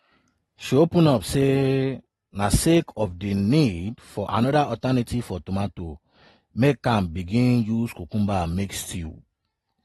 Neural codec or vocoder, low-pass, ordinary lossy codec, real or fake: none; 19.8 kHz; AAC, 32 kbps; real